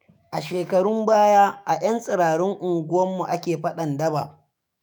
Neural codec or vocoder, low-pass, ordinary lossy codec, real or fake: autoencoder, 48 kHz, 128 numbers a frame, DAC-VAE, trained on Japanese speech; none; none; fake